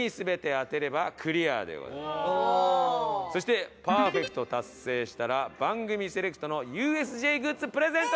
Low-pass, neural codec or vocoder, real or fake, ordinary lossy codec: none; none; real; none